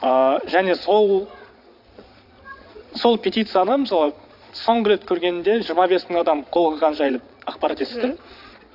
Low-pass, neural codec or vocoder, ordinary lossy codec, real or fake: 5.4 kHz; vocoder, 22.05 kHz, 80 mel bands, Vocos; AAC, 48 kbps; fake